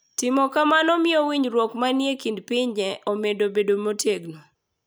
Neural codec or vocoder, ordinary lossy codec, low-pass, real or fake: none; none; none; real